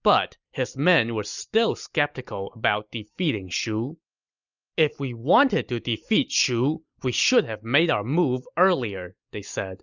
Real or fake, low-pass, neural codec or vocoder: real; 7.2 kHz; none